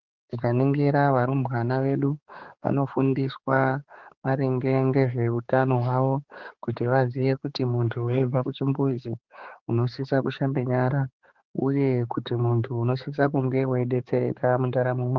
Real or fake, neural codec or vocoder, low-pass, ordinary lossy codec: fake; codec, 16 kHz, 4 kbps, X-Codec, HuBERT features, trained on balanced general audio; 7.2 kHz; Opus, 16 kbps